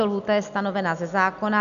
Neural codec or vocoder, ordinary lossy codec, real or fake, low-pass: none; AAC, 96 kbps; real; 7.2 kHz